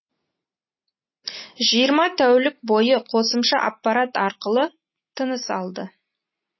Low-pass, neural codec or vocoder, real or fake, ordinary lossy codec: 7.2 kHz; none; real; MP3, 24 kbps